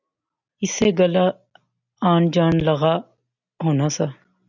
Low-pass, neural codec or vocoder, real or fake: 7.2 kHz; none; real